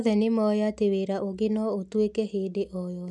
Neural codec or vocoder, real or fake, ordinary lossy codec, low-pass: none; real; none; none